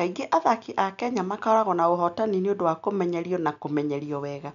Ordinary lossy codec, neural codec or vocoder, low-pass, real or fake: none; none; 7.2 kHz; real